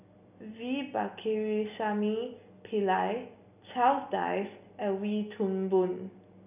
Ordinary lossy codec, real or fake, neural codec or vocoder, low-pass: none; real; none; 3.6 kHz